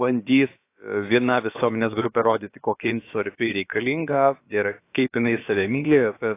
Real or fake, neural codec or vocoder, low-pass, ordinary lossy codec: fake; codec, 16 kHz, about 1 kbps, DyCAST, with the encoder's durations; 3.6 kHz; AAC, 24 kbps